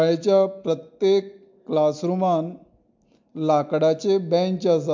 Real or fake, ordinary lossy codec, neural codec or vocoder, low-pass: real; MP3, 64 kbps; none; 7.2 kHz